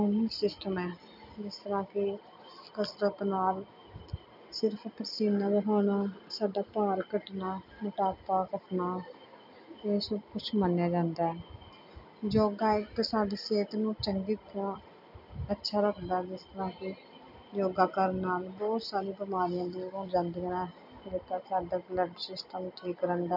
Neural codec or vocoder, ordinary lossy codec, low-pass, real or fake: none; none; 5.4 kHz; real